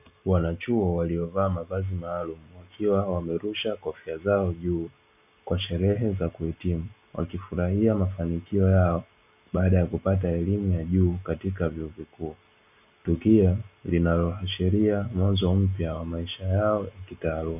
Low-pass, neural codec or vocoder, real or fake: 3.6 kHz; none; real